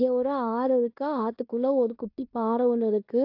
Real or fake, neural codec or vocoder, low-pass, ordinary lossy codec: fake; codec, 16 kHz in and 24 kHz out, 0.9 kbps, LongCat-Audio-Codec, fine tuned four codebook decoder; 5.4 kHz; AAC, 48 kbps